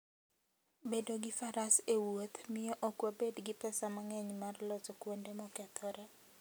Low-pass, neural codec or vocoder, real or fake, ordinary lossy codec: none; none; real; none